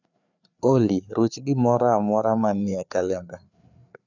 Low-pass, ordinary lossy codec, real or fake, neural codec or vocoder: 7.2 kHz; none; fake; codec, 16 kHz, 4 kbps, FreqCodec, larger model